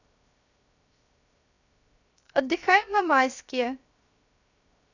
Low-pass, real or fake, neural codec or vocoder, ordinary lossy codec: 7.2 kHz; fake; codec, 16 kHz, 0.7 kbps, FocalCodec; none